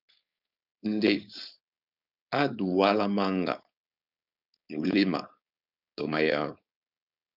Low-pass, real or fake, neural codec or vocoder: 5.4 kHz; fake; codec, 16 kHz, 4.8 kbps, FACodec